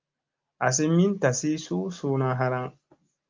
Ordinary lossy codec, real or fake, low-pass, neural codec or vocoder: Opus, 24 kbps; real; 7.2 kHz; none